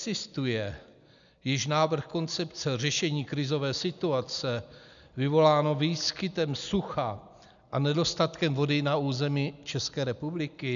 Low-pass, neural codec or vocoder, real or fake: 7.2 kHz; none; real